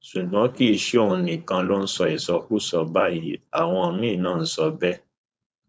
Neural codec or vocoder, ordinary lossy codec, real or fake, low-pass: codec, 16 kHz, 4.8 kbps, FACodec; none; fake; none